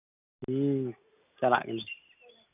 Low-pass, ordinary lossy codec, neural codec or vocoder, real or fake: 3.6 kHz; none; none; real